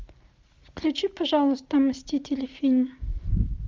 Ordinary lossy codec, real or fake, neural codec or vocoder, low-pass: Opus, 32 kbps; real; none; 7.2 kHz